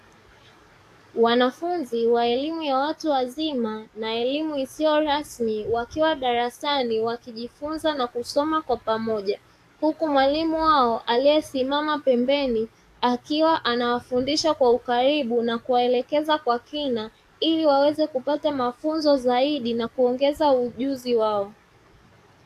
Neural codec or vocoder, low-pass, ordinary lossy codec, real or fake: codec, 44.1 kHz, 7.8 kbps, DAC; 14.4 kHz; AAC, 64 kbps; fake